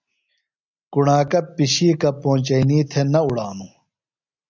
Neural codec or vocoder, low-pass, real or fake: none; 7.2 kHz; real